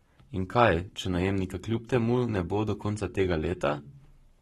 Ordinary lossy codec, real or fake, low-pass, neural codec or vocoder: AAC, 32 kbps; fake; 19.8 kHz; codec, 44.1 kHz, 7.8 kbps, Pupu-Codec